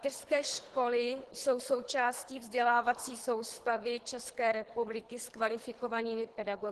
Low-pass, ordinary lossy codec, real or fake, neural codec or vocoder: 10.8 kHz; Opus, 16 kbps; fake; codec, 24 kHz, 3 kbps, HILCodec